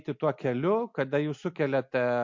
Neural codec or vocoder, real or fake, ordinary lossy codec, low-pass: none; real; MP3, 48 kbps; 7.2 kHz